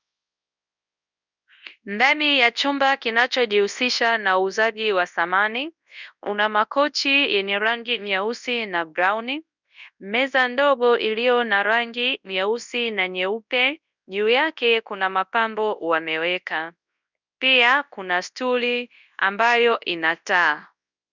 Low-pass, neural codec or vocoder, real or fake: 7.2 kHz; codec, 24 kHz, 0.9 kbps, WavTokenizer, large speech release; fake